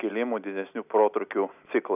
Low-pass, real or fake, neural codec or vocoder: 3.6 kHz; real; none